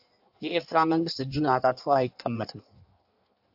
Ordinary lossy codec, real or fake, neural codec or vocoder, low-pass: AAC, 48 kbps; fake; codec, 16 kHz in and 24 kHz out, 1.1 kbps, FireRedTTS-2 codec; 5.4 kHz